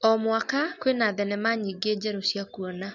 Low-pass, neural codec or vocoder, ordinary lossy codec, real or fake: 7.2 kHz; none; none; real